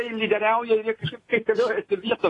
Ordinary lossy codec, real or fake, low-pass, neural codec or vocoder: AAC, 32 kbps; real; 10.8 kHz; none